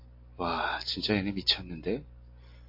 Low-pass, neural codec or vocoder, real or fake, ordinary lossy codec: 5.4 kHz; none; real; MP3, 32 kbps